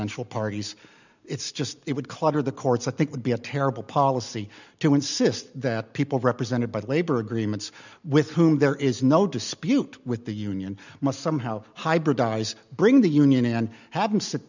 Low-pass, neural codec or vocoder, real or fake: 7.2 kHz; none; real